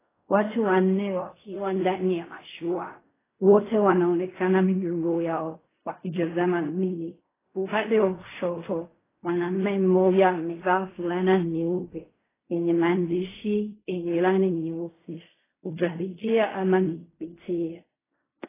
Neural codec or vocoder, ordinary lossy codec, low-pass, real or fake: codec, 16 kHz in and 24 kHz out, 0.4 kbps, LongCat-Audio-Codec, fine tuned four codebook decoder; AAC, 16 kbps; 3.6 kHz; fake